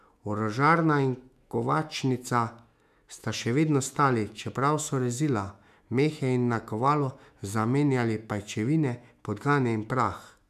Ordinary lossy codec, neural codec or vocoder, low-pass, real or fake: none; autoencoder, 48 kHz, 128 numbers a frame, DAC-VAE, trained on Japanese speech; 14.4 kHz; fake